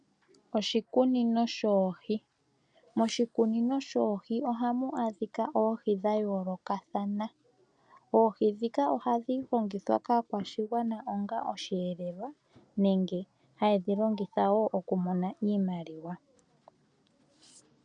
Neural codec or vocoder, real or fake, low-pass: none; real; 9.9 kHz